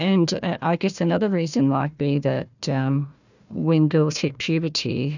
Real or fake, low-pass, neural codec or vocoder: fake; 7.2 kHz; codec, 16 kHz, 1 kbps, FunCodec, trained on Chinese and English, 50 frames a second